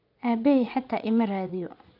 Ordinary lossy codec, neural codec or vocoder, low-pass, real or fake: none; vocoder, 44.1 kHz, 80 mel bands, Vocos; 5.4 kHz; fake